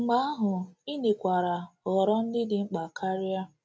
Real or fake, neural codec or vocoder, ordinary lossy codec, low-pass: real; none; none; none